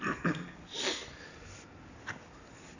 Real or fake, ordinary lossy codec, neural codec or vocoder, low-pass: real; none; none; 7.2 kHz